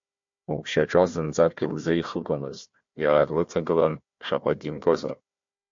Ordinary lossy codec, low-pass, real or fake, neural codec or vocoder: MP3, 48 kbps; 7.2 kHz; fake; codec, 16 kHz, 1 kbps, FunCodec, trained on Chinese and English, 50 frames a second